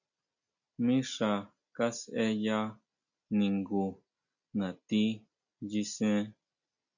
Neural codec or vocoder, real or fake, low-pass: none; real; 7.2 kHz